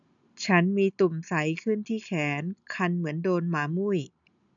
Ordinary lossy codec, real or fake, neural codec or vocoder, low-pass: none; real; none; 7.2 kHz